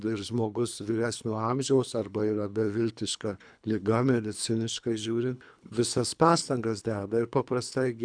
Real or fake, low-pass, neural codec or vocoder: fake; 9.9 kHz; codec, 24 kHz, 3 kbps, HILCodec